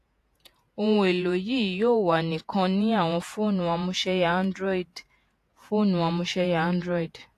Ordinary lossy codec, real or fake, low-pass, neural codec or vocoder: AAC, 64 kbps; fake; 14.4 kHz; vocoder, 48 kHz, 128 mel bands, Vocos